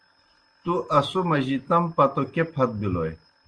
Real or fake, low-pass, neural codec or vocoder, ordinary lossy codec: real; 9.9 kHz; none; Opus, 24 kbps